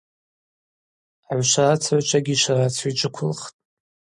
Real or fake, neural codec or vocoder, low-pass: real; none; 10.8 kHz